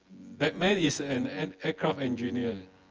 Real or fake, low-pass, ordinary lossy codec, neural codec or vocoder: fake; 7.2 kHz; Opus, 24 kbps; vocoder, 24 kHz, 100 mel bands, Vocos